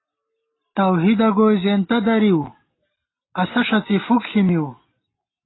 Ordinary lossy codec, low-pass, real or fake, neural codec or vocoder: AAC, 16 kbps; 7.2 kHz; real; none